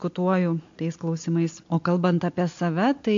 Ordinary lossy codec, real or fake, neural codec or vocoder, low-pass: MP3, 48 kbps; real; none; 7.2 kHz